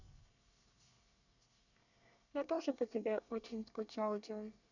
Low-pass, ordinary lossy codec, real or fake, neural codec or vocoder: 7.2 kHz; none; fake; codec, 24 kHz, 1 kbps, SNAC